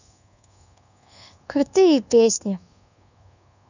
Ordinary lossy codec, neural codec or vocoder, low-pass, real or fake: none; codec, 24 kHz, 1.2 kbps, DualCodec; 7.2 kHz; fake